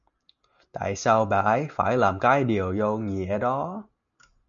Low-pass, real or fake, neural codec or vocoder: 7.2 kHz; real; none